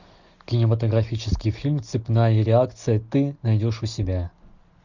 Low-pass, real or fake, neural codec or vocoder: 7.2 kHz; real; none